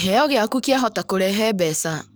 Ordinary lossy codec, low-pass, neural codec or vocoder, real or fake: none; none; codec, 44.1 kHz, 7.8 kbps, DAC; fake